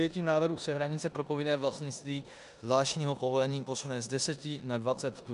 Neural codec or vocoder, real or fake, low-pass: codec, 16 kHz in and 24 kHz out, 0.9 kbps, LongCat-Audio-Codec, four codebook decoder; fake; 10.8 kHz